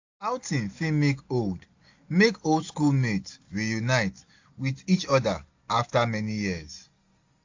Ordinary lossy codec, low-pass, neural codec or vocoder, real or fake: AAC, 48 kbps; 7.2 kHz; none; real